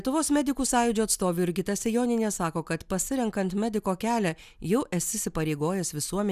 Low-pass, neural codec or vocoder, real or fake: 14.4 kHz; none; real